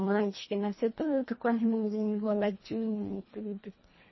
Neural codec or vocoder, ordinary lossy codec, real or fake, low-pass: codec, 24 kHz, 1.5 kbps, HILCodec; MP3, 24 kbps; fake; 7.2 kHz